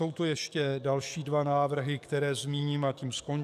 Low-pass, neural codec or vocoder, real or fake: 14.4 kHz; codec, 44.1 kHz, 7.8 kbps, DAC; fake